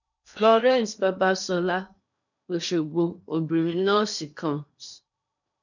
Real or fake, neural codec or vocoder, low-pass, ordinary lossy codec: fake; codec, 16 kHz in and 24 kHz out, 0.8 kbps, FocalCodec, streaming, 65536 codes; 7.2 kHz; none